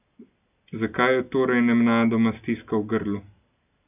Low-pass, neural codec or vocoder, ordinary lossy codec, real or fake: 3.6 kHz; none; none; real